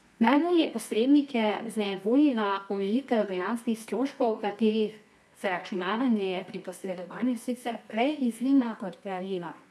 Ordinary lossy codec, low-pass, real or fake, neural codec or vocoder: none; none; fake; codec, 24 kHz, 0.9 kbps, WavTokenizer, medium music audio release